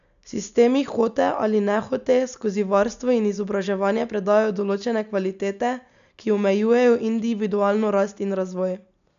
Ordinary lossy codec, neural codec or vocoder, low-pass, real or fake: none; none; 7.2 kHz; real